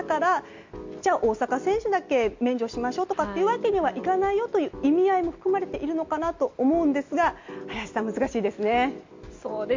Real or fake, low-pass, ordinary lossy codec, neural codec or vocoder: real; 7.2 kHz; MP3, 64 kbps; none